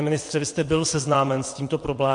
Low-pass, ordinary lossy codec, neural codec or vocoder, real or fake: 9.9 kHz; MP3, 48 kbps; vocoder, 22.05 kHz, 80 mel bands, WaveNeXt; fake